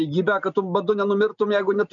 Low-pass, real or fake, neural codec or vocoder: 7.2 kHz; real; none